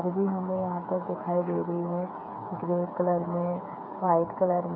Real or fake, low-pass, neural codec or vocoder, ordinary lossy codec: fake; 5.4 kHz; codec, 16 kHz, 8 kbps, FreqCodec, smaller model; none